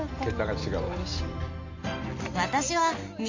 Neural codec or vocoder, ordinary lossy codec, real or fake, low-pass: none; AAC, 48 kbps; real; 7.2 kHz